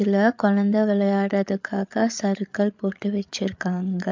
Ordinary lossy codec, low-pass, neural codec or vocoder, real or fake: MP3, 64 kbps; 7.2 kHz; codec, 16 kHz, 8 kbps, FreqCodec, larger model; fake